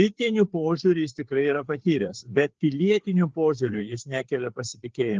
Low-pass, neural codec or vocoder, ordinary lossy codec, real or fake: 7.2 kHz; codec, 16 kHz, 4 kbps, FreqCodec, larger model; Opus, 16 kbps; fake